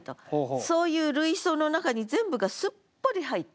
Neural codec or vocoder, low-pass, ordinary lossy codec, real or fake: none; none; none; real